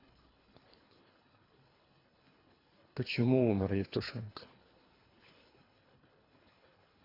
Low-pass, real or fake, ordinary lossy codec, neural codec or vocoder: 5.4 kHz; fake; AAC, 24 kbps; codec, 24 kHz, 3 kbps, HILCodec